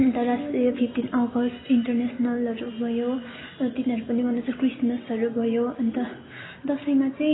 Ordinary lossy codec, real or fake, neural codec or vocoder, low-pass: AAC, 16 kbps; real; none; 7.2 kHz